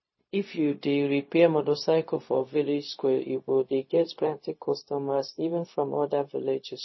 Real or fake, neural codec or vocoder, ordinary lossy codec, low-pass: fake; codec, 16 kHz, 0.4 kbps, LongCat-Audio-Codec; MP3, 24 kbps; 7.2 kHz